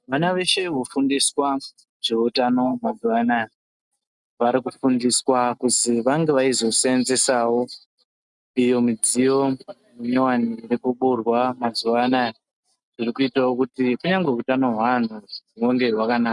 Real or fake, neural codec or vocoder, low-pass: real; none; 10.8 kHz